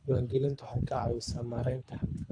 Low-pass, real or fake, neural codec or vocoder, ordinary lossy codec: 9.9 kHz; fake; codec, 24 kHz, 3 kbps, HILCodec; AAC, 64 kbps